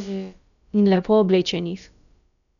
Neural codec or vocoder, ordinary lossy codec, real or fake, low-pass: codec, 16 kHz, about 1 kbps, DyCAST, with the encoder's durations; none; fake; 7.2 kHz